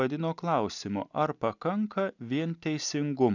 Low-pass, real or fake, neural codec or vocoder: 7.2 kHz; real; none